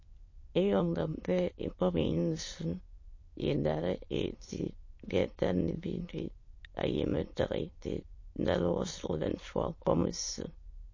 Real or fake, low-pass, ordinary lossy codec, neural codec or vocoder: fake; 7.2 kHz; MP3, 32 kbps; autoencoder, 22.05 kHz, a latent of 192 numbers a frame, VITS, trained on many speakers